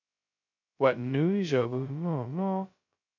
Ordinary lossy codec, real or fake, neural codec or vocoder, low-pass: MP3, 48 kbps; fake; codec, 16 kHz, 0.2 kbps, FocalCodec; 7.2 kHz